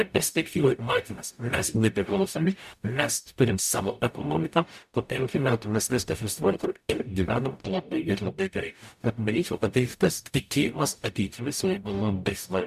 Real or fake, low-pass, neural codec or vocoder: fake; 14.4 kHz; codec, 44.1 kHz, 0.9 kbps, DAC